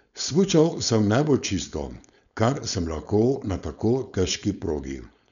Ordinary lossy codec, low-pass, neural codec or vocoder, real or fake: none; 7.2 kHz; codec, 16 kHz, 4.8 kbps, FACodec; fake